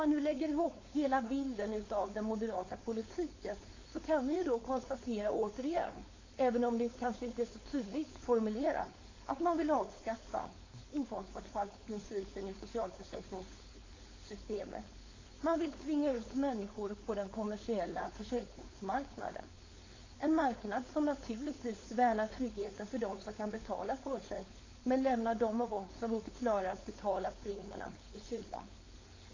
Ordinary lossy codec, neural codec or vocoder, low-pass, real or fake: AAC, 32 kbps; codec, 16 kHz, 4.8 kbps, FACodec; 7.2 kHz; fake